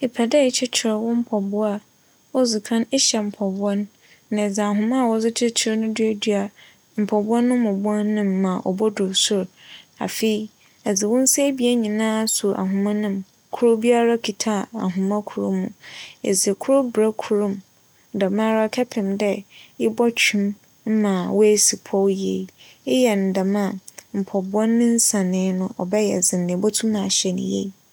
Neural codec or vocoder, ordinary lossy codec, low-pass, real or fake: none; none; none; real